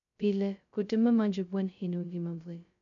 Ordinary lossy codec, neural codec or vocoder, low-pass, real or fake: none; codec, 16 kHz, 0.2 kbps, FocalCodec; 7.2 kHz; fake